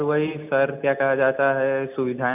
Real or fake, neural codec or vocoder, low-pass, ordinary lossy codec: real; none; 3.6 kHz; none